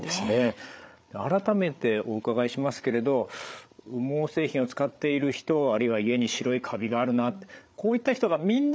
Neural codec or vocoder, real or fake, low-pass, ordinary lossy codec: codec, 16 kHz, 8 kbps, FreqCodec, larger model; fake; none; none